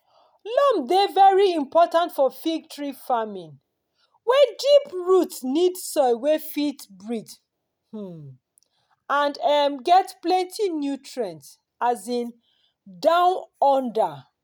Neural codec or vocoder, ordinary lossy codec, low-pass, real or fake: none; none; none; real